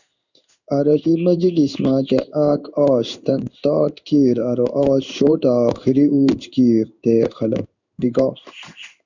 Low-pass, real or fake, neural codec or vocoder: 7.2 kHz; fake; codec, 16 kHz in and 24 kHz out, 1 kbps, XY-Tokenizer